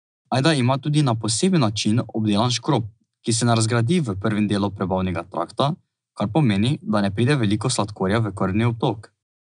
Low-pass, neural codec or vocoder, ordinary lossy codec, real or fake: 9.9 kHz; none; none; real